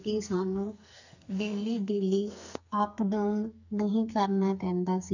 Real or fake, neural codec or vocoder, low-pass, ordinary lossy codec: fake; codec, 44.1 kHz, 2.6 kbps, SNAC; 7.2 kHz; none